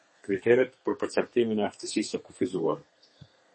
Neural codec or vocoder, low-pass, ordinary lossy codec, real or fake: codec, 32 kHz, 1.9 kbps, SNAC; 10.8 kHz; MP3, 32 kbps; fake